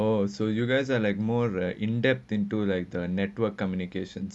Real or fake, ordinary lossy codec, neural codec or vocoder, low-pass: real; none; none; none